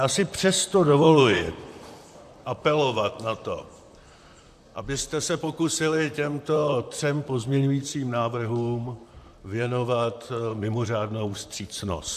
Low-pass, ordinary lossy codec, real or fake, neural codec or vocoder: 14.4 kHz; AAC, 96 kbps; fake; vocoder, 44.1 kHz, 128 mel bands, Pupu-Vocoder